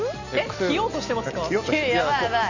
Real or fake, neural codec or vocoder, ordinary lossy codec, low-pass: real; none; none; 7.2 kHz